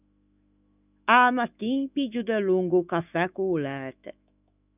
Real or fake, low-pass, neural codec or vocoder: fake; 3.6 kHz; autoencoder, 48 kHz, 128 numbers a frame, DAC-VAE, trained on Japanese speech